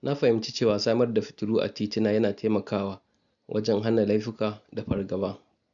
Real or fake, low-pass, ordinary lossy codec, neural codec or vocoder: real; 7.2 kHz; none; none